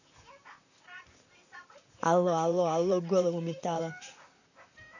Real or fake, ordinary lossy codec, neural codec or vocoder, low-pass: fake; none; vocoder, 44.1 kHz, 128 mel bands, Pupu-Vocoder; 7.2 kHz